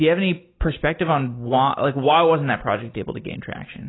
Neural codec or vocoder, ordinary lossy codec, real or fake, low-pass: none; AAC, 16 kbps; real; 7.2 kHz